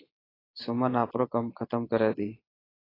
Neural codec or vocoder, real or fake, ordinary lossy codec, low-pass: vocoder, 22.05 kHz, 80 mel bands, Vocos; fake; AAC, 24 kbps; 5.4 kHz